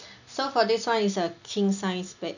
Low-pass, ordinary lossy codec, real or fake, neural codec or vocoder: 7.2 kHz; none; real; none